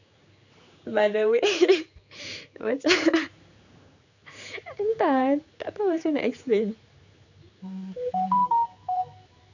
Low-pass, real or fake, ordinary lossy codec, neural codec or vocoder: 7.2 kHz; fake; none; codec, 16 kHz, 4 kbps, X-Codec, HuBERT features, trained on general audio